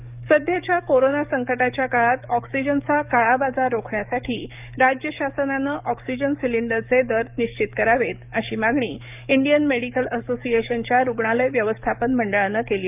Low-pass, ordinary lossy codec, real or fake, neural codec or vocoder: 3.6 kHz; none; fake; codec, 44.1 kHz, 7.8 kbps, DAC